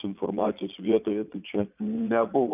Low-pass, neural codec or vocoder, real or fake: 3.6 kHz; vocoder, 22.05 kHz, 80 mel bands, Vocos; fake